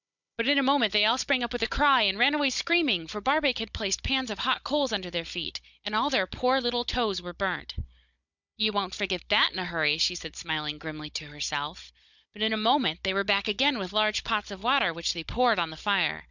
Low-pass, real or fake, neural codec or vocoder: 7.2 kHz; fake; codec, 16 kHz, 16 kbps, FunCodec, trained on Chinese and English, 50 frames a second